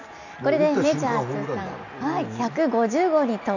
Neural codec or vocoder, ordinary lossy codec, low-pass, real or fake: none; none; 7.2 kHz; real